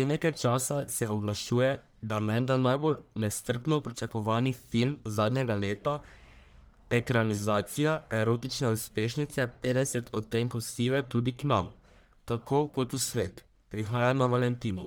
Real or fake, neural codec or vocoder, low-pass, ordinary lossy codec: fake; codec, 44.1 kHz, 1.7 kbps, Pupu-Codec; none; none